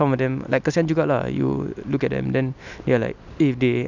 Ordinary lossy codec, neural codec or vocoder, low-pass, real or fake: none; none; 7.2 kHz; real